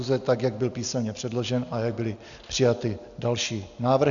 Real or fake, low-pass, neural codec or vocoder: real; 7.2 kHz; none